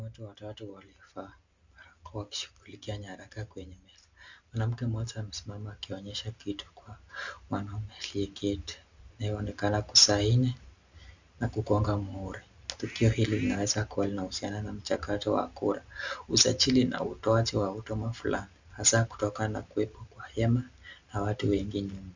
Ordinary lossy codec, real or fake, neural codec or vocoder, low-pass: Opus, 64 kbps; real; none; 7.2 kHz